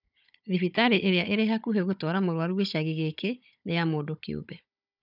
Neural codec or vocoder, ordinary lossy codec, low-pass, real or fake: codec, 16 kHz, 16 kbps, FunCodec, trained on Chinese and English, 50 frames a second; none; 5.4 kHz; fake